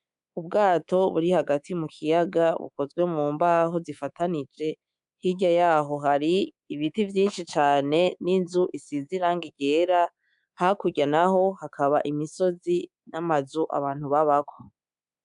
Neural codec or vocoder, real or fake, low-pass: codec, 24 kHz, 3.1 kbps, DualCodec; fake; 10.8 kHz